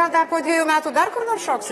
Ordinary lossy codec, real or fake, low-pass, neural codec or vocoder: AAC, 32 kbps; fake; 19.8 kHz; vocoder, 44.1 kHz, 128 mel bands, Pupu-Vocoder